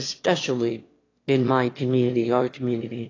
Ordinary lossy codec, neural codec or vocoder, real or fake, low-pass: AAC, 32 kbps; autoencoder, 22.05 kHz, a latent of 192 numbers a frame, VITS, trained on one speaker; fake; 7.2 kHz